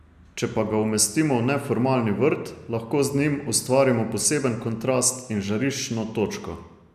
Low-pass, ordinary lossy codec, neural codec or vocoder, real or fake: 14.4 kHz; none; none; real